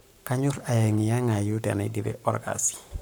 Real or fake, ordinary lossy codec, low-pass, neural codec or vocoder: fake; none; none; codec, 44.1 kHz, 7.8 kbps, Pupu-Codec